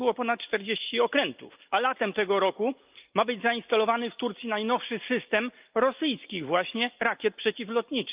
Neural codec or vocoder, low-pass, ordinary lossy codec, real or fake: none; 3.6 kHz; Opus, 24 kbps; real